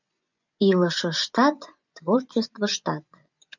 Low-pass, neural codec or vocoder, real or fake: 7.2 kHz; vocoder, 24 kHz, 100 mel bands, Vocos; fake